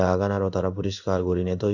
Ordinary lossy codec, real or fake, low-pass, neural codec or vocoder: none; fake; 7.2 kHz; codec, 16 kHz in and 24 kHz out, 1 kbps, XY-Tokenizer